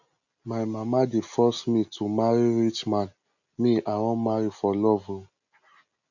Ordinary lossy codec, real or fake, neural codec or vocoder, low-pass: none; real; none; 7.2 kHz